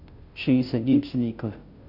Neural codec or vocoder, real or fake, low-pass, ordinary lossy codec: codec, 16 kHz, 0.5 kbps, FunCodec, trained on Chinese and English, 25 frames a second; fake; 5.4 kHz; none